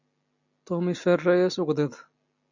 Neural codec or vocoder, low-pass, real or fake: none; 7.2 kHz; real